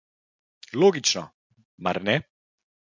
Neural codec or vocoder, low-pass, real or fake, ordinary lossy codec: none; 7.2 kHz; real; MP3, 48 kbps